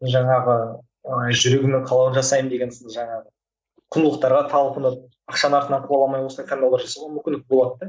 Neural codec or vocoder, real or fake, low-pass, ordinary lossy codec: none; real; none; none